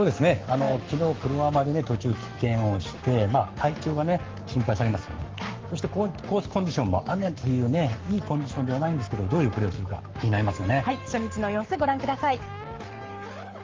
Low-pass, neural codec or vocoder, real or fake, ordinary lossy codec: 7.2 kHz; codec, 44.1 kHz, 7.8 kbps, Pupu-Codec; fake; Opus, 32 kbps